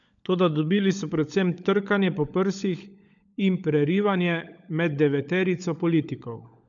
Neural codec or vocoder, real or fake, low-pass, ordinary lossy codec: codec, 16 kHz, 16 kbps, FunCodec, trained on LibriTTS, 50 frames a second; fake; 7.2 kHz; none